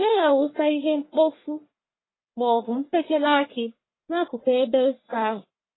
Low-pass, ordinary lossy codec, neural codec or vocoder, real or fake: 7.2 kHz; AAC, 16 kbps; codec, 44.1 kHz, 1.7 kbps, Pupu-Codec; fake